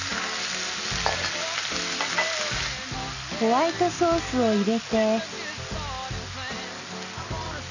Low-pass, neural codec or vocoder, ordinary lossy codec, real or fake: 7.2 kHz; none; none; real